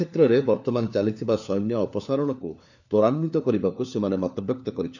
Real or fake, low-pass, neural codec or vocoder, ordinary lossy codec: fake; 7.2 kHz; codec, 16 kHz, 4 kbps, FunCodec, trained on LibriTTS, 50 frames a second; none